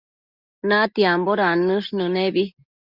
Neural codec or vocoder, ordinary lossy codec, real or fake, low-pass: none; Opus, 64 kbps; real; 5.4 kHz